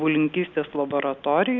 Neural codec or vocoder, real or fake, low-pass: none; real; 7.2 kHz